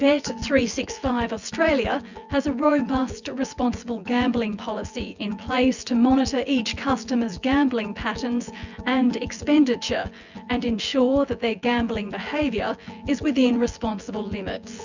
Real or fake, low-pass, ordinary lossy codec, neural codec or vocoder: fake; 7.2 kHz; Opus, 64 kbps; vocoder, 24 kHz, 100 mel bands, Vocos